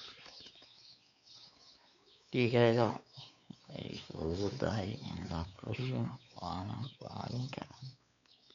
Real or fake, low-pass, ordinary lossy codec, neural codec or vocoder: fake; 7.2 kHz; none; codec, 16 kHz, 4 kbps, X-Codec, WavLM features, trained on Multilingual LibriSpeech